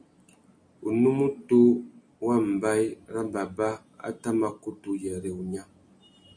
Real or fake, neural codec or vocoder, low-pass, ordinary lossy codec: real; none; 9.9 kHz; MP3, 96 kbps